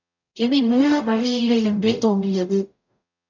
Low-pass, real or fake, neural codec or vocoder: 7.2 kHz; fake; codec, 44.1 kHz, 0.9 kbps, DAC